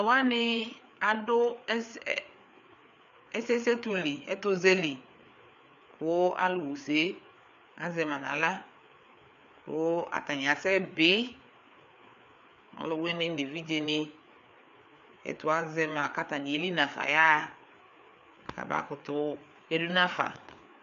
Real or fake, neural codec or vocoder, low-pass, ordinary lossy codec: fake; codec, 16 kHz, 4 kbps, FreqCodec, larger model; 7.2 kHz; MP3, 64 kbps